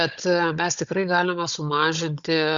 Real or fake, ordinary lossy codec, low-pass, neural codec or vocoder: fake; Opus, 64 kbps; 7.2 kHz; codec, 16 kHz, 16 kbps, FunCodec, trained on Chinese and English, 50 frames a second